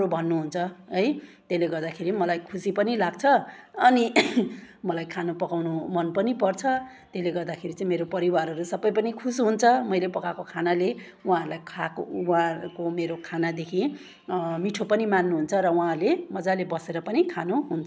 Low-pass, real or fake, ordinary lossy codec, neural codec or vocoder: none; real; none; none